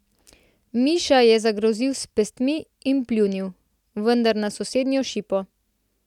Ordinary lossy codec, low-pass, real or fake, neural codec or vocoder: none; 19.8 kHz; real; none